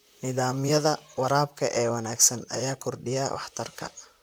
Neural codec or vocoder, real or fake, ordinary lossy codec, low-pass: vocoder, 44.1 kHz, 128 mel bands, Pupu-Vocoder; fake; none; none